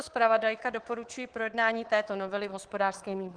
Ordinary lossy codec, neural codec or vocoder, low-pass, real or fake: Opus, 16 kbps; codec, 24 kHz, 3.1 kbps, DualCodec; 9.9 kHz; fake